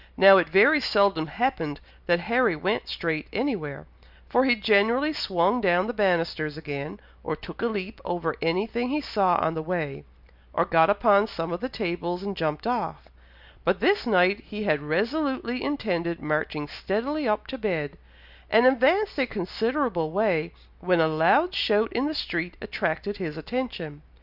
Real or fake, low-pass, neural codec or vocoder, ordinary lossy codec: real; 5.4 kHz; none; Opus, 64 kbps